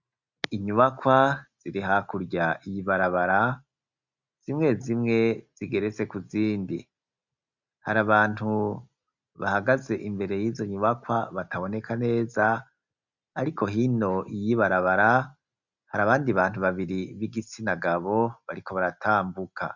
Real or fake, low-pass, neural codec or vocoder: real; 7.2 kHz; none